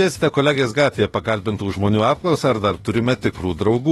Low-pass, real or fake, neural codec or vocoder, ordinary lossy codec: 19.8 kHz; fake; autoencoder, 48 kHz, 32 numbers a frame, DAC-VAE, trained on Japanese speech; AAC, 32 kbps